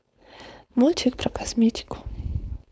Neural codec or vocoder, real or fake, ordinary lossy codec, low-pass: codec, 16 kHz, 4.8 kbps, FACodec; fake; none; none